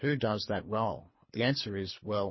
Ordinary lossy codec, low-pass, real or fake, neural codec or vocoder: MP3, 24 kbps; 7.2 kHz; fake; codec, 24 kHz, 3 kbps, HILCodec